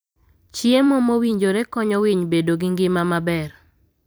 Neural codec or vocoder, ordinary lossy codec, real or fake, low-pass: vocoder, 44.1 kHz, 128 mel bands every 256 samples, BigVGAN v2; none; fake; none